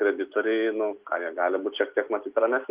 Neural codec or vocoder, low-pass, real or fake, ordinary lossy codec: none; 3.6 kHz; real; Opus, 32 kbps